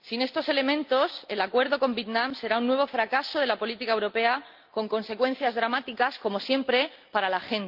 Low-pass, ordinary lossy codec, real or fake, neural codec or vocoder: 5.4 kHz; Opus, 24 kbps; real; none